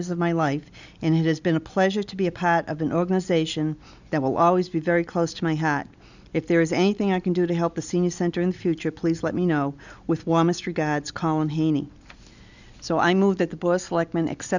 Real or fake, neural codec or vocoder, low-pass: real; none; 7.2 kHz